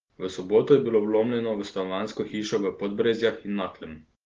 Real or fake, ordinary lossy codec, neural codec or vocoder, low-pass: real; Opus, 16 kbps; none; 7.2 kHz